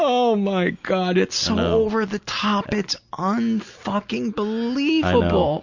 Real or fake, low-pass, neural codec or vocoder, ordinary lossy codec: real; 7.2 kHz; none; Opus, 64 kbps